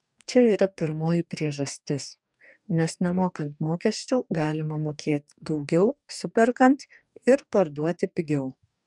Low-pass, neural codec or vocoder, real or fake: 10.8 kHz; codec, 44.1 kHz, 2.6 kbps, DAC; fake